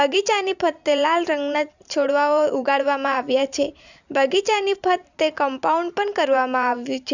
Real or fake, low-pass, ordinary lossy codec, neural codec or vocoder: fake; 7.2 kHz; none; vocoder, 44.1 kHz, 128 mel bands every 512 samples, BigVGAN v2